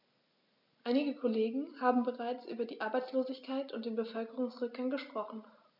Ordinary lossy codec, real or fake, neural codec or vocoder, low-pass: none; real; none; 5.4 kHz